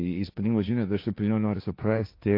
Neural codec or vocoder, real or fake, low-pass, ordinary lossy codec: codec, 16 kHz, 1.1 kbps, Voila-Tokenizer; fake; 5.4 kHz; AAC, 32 kbps